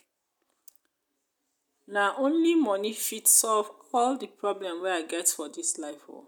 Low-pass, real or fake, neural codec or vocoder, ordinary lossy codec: none; real; none; none